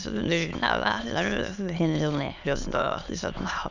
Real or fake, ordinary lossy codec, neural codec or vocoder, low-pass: fake; none; autoencoder, 22.05 kHz, a latent of 192 numbers a frame, VITS, trained on many speakers; 7.2 kHz